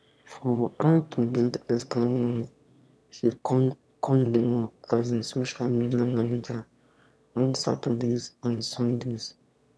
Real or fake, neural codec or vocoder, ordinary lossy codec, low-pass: fake; autoencoder, 22.05 kHz, a latent of 192 numbers a frame, VITS, trained on one speaker; none; none